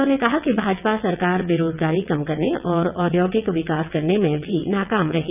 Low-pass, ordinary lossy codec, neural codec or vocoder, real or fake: 3.6 kHz; none; vocoder, 22.05 kHz, 80 mel bands, WaveNeXt; fake